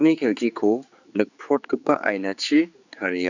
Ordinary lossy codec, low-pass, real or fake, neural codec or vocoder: none; 7.2 kHz; fake; codec, 16 kHz, 4 kbps, X-Codec, HuBERT features, trained on general audio